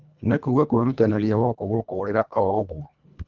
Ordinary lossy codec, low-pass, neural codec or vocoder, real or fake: Opus, 24 kbps; 7.2 kHz; codec, 24 kHz, 1.5 kbps, HILCodec; fake